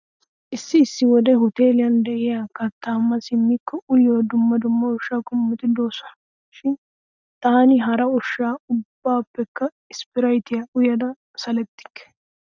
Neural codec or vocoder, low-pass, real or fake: none; 7.2 kHz; real